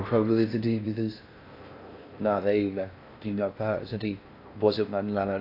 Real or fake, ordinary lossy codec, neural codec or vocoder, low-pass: fake; AAC, 32 kbps; codec, 16 kHz in and 24 kHz out, 0.6 kbps, FocalCodec, streaming, 4096 codes; 5.4 kHz